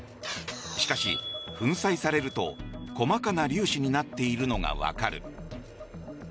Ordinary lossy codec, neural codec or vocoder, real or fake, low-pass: none; none; real; none